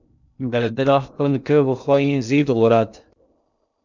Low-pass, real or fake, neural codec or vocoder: 7.2 kHz; fake; codec, 16 kHz in and 24 kHz out, 0.6 kbps, FocalCodec, streaming, 2048 codes